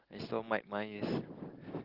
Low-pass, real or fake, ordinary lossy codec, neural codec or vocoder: 5.4 kHz; real; Opus, 16 kbps; none